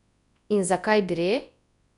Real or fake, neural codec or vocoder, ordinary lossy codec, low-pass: fake; codec, 24 kHz, 0.9 kbps, WavTokenizer, large speech release; none; 10.8 kHz